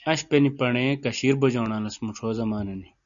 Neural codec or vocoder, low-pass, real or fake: none; 7.2 kHz; real